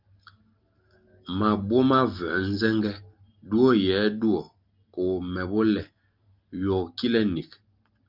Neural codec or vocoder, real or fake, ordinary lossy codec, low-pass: none; real; Opus, 24 kbps; 5.4 kHz